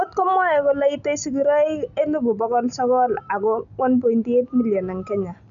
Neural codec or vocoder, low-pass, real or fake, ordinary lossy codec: none; 7.2 kHz; real; none